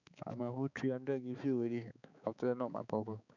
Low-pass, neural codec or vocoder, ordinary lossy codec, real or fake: 7.2 kHz; codec, 16 kHz, 4 kbps, X-Codec, HuBERT features, trained on balanced general audio; none; fake